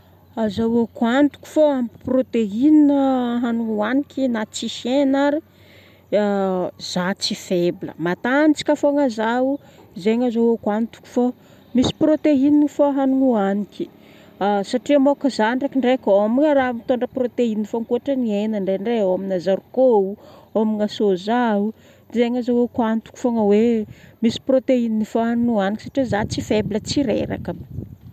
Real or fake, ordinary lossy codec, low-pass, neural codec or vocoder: real; none; 14.4 kHz; none